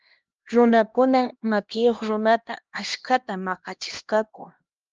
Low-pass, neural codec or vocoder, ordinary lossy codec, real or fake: 7.2 kHz; codec, 16 kHz, 1 kbps, X-Codec, HuBERT features, trained on LibriSpeech; Opus, 24 kbps; fake